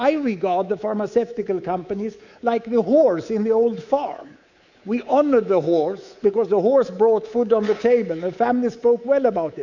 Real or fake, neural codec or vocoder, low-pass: fake; codec, 24 kHz, 3.1 kbps, DualCodec; 7.2 kHz